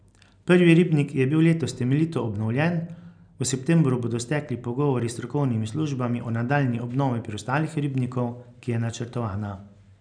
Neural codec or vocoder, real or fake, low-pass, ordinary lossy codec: none; real; 9.9 kHz; none